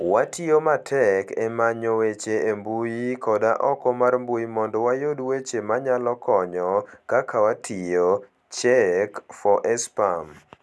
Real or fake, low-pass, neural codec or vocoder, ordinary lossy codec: real; none; none; none